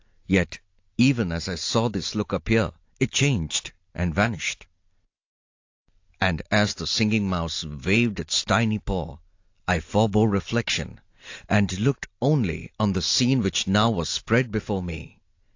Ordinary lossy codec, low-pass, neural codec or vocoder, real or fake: AAC, 48 kbps; 7.2 kHz; none; real